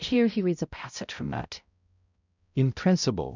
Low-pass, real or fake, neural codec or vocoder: 7.2 kHz; fake; codec, 16 kHz, 0.5 kbps, X-Codec, HuBERT features, trained on balanced general audio